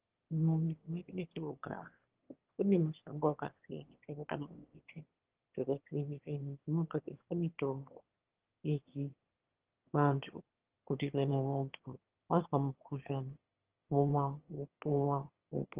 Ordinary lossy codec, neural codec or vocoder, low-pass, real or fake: Opus, 16 kbps; autoencoder, 22.05 kHz, a latent of 192 numbers a frame, VITS, trained on one speaker; 3.6 kHz; fake